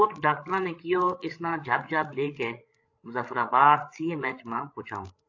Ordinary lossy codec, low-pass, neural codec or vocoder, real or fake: AAC, 48 kbps; 7.2 kHz; codec, 16 kHz, 8 kbps, FreqCodec, larger model; fake